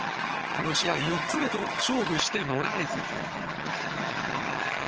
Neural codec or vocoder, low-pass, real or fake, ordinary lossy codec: vocoder, 22.05 kHz, 80 mel bands, HiFi-GAN; 7.2 kHz; fake; Opus, 16 kbps